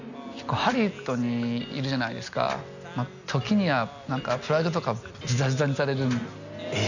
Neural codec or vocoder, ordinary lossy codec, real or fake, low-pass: none; none; real; 7.2 kHz